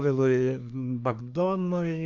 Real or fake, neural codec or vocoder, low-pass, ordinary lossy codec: fake; codec, 24 kHz, 1 kbps, SNAC; 7.2 kHz; MP3, 64 kbps